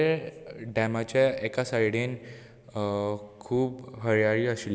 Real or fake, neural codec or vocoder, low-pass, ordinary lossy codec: real; none; none; none